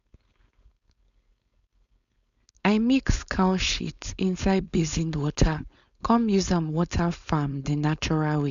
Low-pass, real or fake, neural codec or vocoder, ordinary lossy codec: 7.2 kHz; fake; codec, 16 kHz, 4.8 kbps, FACodec; none